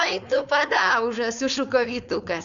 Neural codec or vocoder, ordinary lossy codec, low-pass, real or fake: codec, 16 kHz, 4.8 kbps, FACodec; Opus, 64 kbps; 7.2 kHz; fake